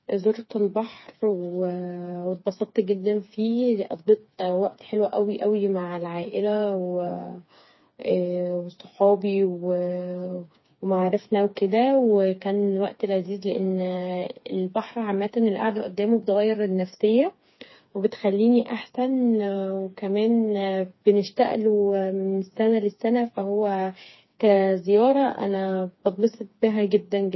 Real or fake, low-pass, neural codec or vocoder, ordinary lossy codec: fake; 7.2 kHz; codec, 16 kHz, 4 kbps, FreqCodec, smaller model; MP3, 24 kbps